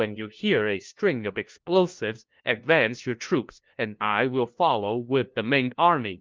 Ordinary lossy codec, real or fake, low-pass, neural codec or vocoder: Opus, 32 kbps; fake; 7.2 kHz; codec, 16 kHz, 1 kbps, FunCodec, trained on LibriTTS, 50 frames a second